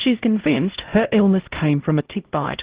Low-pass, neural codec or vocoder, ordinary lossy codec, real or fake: 3.6 kHz; codec, 16 kHz, 0.5 kbps, X-Codec, HuBERT features, trained on LibriSpeech; Opus, 16 kbps; fake